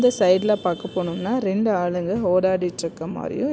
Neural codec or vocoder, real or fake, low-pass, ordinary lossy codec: none; real; none; none